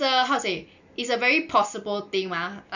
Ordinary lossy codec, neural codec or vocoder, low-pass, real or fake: none; none; 7.2 kHz; real